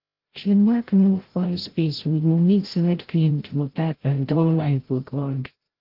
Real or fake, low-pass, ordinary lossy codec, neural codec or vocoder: fake; 5.4 kHz; Opus, 16 kbps; codec, 16 kHz, 0.5 kbps, FreqCodec, larger model